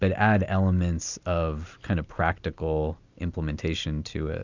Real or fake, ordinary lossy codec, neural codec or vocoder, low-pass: real; Opus, 64 kbps; none; 7.2 kHz